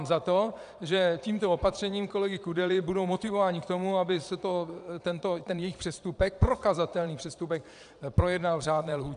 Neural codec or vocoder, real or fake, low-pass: vocoder, 22.05 kHz, 80 mel bands, Vocos; fake; 9.9 kHz